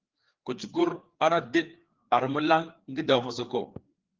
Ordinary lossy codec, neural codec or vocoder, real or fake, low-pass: Opus, 16 kbps; codec, 16 kHz, 4 kbps, FreqCodec, larger model; fake; 7.2 kHz